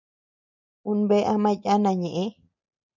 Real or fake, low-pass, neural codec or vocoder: real; 7.2 kHz; none